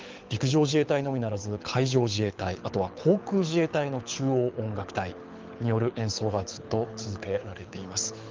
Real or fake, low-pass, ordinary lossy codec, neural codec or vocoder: fake; 7.2 kHz; Opus, 32 kbps; codec, 24 kHz, 6 kbps, HILCodec